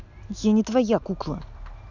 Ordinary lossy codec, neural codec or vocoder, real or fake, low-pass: none; none; real; 7.2 kHz